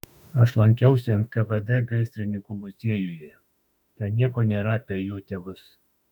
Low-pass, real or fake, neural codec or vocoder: 19.8 kHz; fake; autoencoder, 48 kHz, 32 numbers a frame, DAC-VAE, trained on Japanese speech